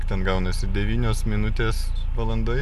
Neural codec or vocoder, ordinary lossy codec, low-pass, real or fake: none; MP3, 96 kbps; 14.4 kHz; real